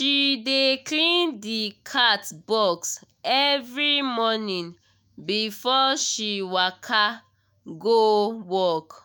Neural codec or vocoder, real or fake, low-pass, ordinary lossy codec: autoencoder, 48 kHz, 128 numbers a frame, DAC-VAE, trained on Japanese speech; fake; none; none